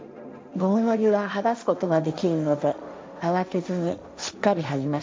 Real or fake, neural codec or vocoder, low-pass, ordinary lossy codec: fake; codec, 16 kHz, 1.1 kbps, Voila-Tokenizer; none; none